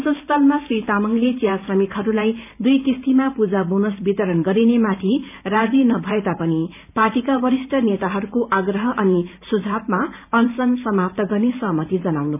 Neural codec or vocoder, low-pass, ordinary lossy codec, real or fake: vocoder, 44.1 kHz, 128 mel bands every 512 samples, BigVGAN v2; 3.6 kHz; none; fake